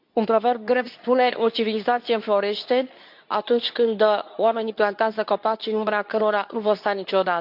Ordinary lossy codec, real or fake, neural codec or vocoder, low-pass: AAC, 48 kbps; fake; codec, 24 kHz, 0.9 kbps, WavTokenizer, medium speech release version 2; 5.4 kHz